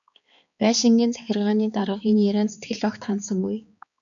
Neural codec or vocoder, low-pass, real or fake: codec, 16 kHz, 4 kbps, X-Codec, HuBERT features, trained on balanced general audio; 7.2 kHz; fake